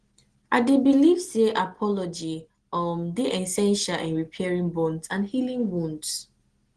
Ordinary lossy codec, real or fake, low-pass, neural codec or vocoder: Opus, 16 kbps; real; 9.9 kHz; none